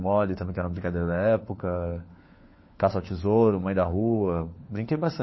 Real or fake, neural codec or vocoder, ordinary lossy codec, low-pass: fake; codec, 16 kHz, 4 kbps, FunCodec, trained on LibriTTS, 50 frames a second; MP3, 24 kbps; 7.2 kHz